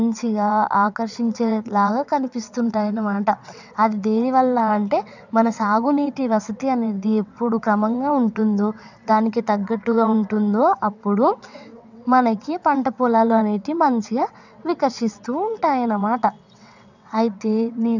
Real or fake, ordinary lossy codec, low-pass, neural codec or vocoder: fake; none; 7.2 kHz; vocoder, 22.05 kHz, 80 mel bands, WaveNeXt